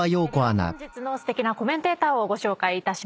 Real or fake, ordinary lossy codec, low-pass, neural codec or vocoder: real; none; none; none